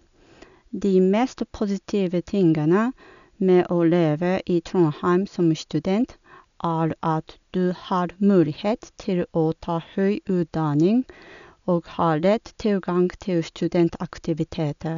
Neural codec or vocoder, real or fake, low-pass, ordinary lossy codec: none; real; 7.2 kHz; none